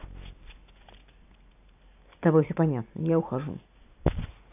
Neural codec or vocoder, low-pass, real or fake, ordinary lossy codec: none; 3.6 kHz; real; AAC, 24 kbps